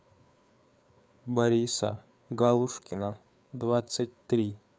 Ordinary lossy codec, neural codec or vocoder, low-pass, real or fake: none; codec, 16 kHz, 4 kbps, FreqCodec, larger model; none; fake